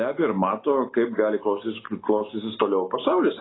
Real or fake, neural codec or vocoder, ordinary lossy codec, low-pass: real; none; AAC, 16 kbps; 7.2 kHz